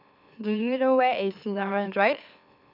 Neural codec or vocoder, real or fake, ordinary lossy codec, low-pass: autoencoder, 44.1 kHz, a latent of 192 numbers a frame, MeloTTS; fake; none; 5.4 kHz